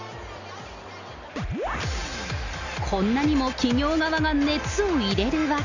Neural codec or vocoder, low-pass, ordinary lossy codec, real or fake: none; 7.2 kHz; none; real